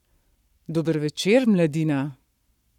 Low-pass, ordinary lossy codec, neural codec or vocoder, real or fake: 19.8 kHz; none; codec, 44.1 kHz, 7.8 kbps, Pupu-Codec; fake